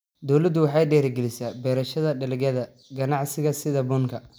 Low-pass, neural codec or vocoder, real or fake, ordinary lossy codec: none; none; real; none